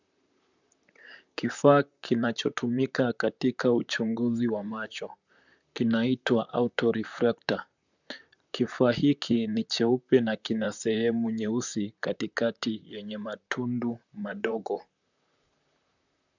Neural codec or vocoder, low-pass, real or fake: vocoder, 44.1 kHz, 128 mel bands, Pupu-Vocoder; 7.2 kHz; fake